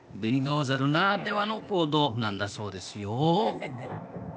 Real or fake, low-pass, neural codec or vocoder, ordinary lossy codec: fake; none; codec, 16 kHz, 0.8 kbps, ZipCodec; none